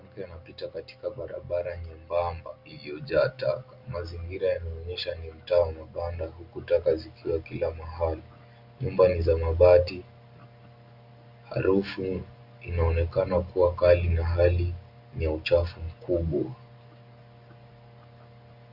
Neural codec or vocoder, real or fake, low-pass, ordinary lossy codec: none; real; 5.4 kHz; Opus, 64 kbps